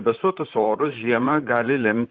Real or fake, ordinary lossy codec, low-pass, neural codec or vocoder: fake; Opus, 32 kbps; 7.2 kHz; vocoder, 44.1 kHz, 128 mel bands, Pupu-Vocoder